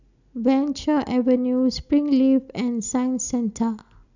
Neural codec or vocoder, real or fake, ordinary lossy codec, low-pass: none; real; none; 7.2 kHz